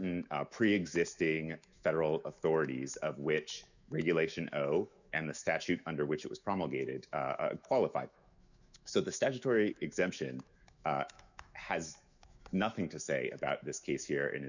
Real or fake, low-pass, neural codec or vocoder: fake; 7.2 kHz; autoencoder, 48 kHz, 128 numbers a frame, DAC-VAE, trained on Japanese speech